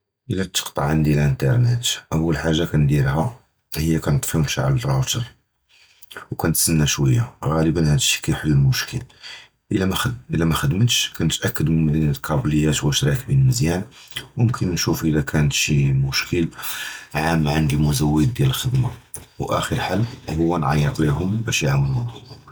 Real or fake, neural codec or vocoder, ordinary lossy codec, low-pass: real; none; none; none